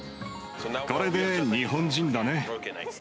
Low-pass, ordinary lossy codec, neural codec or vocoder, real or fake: none; none; none; real